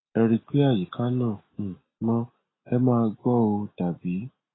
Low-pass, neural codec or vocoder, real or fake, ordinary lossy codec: 7.2 kHz; none; real; AAC, 16 kbps